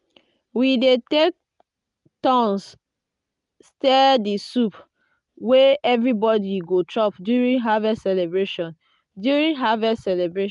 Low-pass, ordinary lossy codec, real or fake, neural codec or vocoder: 10.8 kHz; none; real; none